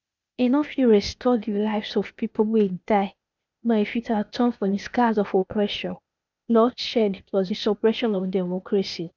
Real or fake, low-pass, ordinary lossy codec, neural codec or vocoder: fake; 7.2 kHz; none; codec, 16 kHz, 0.8 kbps, ZipCodec